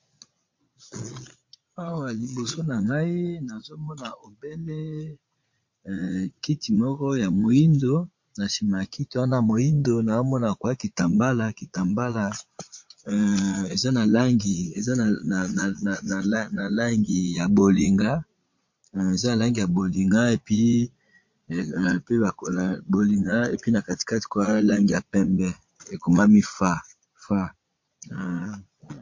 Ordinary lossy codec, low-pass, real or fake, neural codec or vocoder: MP3, 48 kbps; 7.2 kHz; fake; vocoder, 22.05 kHz, 80 mel bands, Vocos